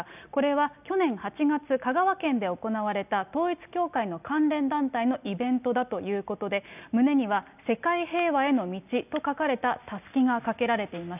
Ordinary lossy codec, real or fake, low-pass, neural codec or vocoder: none; real; 3.6 kHz; none